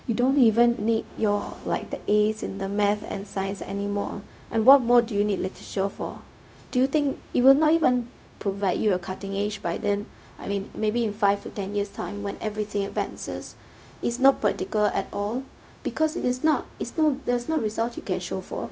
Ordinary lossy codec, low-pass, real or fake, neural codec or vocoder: none; none; fake; codec, 16 kHz, 0.4 kbps, LongCat-Audio-Codec